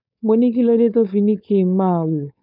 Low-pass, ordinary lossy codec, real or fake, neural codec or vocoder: 5.4 kHz; none; fake; codec, 16 kHz, 4.8 kbps, FACodec